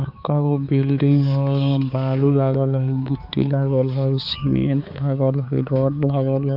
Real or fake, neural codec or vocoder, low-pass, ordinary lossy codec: fake; codec, 16 kHz, 4 kbps, X-Codec, HuBERT features, trained on balanced general audio; 5.4 kHz; none